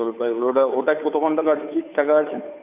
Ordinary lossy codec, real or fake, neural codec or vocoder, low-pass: none; fake; codec, 16 kHz, 2 kbps, FunCodec, trained on Chinese and English, 25 frames a second; 3.6 kHz